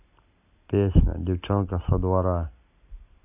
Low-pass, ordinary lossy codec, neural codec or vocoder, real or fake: 3.6 kHz; AAC, 24 kbps; none; real